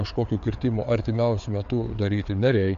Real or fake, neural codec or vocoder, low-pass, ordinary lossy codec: fake; codec, 16 kHz, 4 kbps, FreqCodec, larger model; 7.2 kHz; AAC, 64 kbps